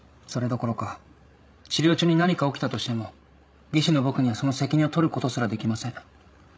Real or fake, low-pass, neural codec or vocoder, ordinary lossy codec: fake; none; codec, 16 kHz, 16 kbps, FreqCodec, larger model; none